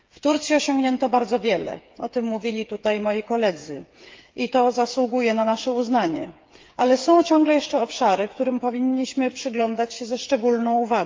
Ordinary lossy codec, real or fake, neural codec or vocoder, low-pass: Opus, 32 kbps; fake; codec, 16 kHz, 8 kbps, FreqCodec, smaller model; 7.2 kHz